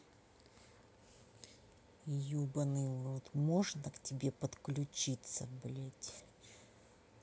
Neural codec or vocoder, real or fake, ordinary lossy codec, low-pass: none; real; none; none